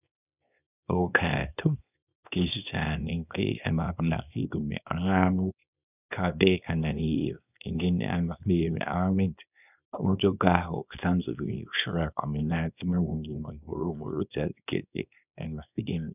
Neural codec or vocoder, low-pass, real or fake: codec, 24 kHz, 0.9 kbps, WavTokenizer, small release; 3.6 kHz; fake